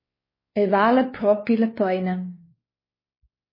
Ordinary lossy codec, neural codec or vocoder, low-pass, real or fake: MP3, 24 kbps; codec, 16 kHz, 2 kbps, X-Codec, WavLM features, trained on Multilingual LibriSpeech; 5.4 kHz; fake